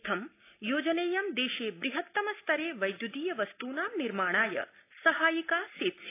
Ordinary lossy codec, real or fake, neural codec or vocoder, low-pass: AAC, 24 kbps; real; none; 3.6 kHz